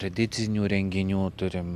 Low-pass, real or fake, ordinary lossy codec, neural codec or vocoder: 14.4 kHz; real; MP3, 96 kbps; none